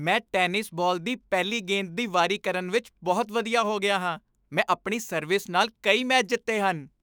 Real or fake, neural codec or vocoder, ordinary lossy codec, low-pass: fake; autoencoder, 48 kHz, 128 numbers a frame, DAC-VAE, trained on Japanese speech; none; none